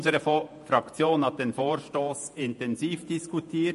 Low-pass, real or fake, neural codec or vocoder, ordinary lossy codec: 14.4 kHz; fake; vocoder, 48 kHz, 128 mel bands, Vocos; MP3, 48 kbps